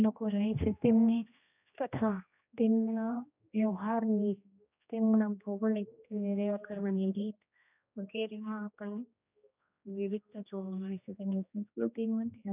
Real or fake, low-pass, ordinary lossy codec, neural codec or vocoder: fake; 3.6 kHz; none; codec, 16 kHz, 1 kbps, X-Codec, HuBERT features, trained on general audio